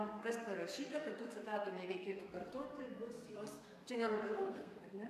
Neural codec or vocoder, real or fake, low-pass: codec, 32 kHz, 1.9 kbps, SNAC; fake; 14.4 kHz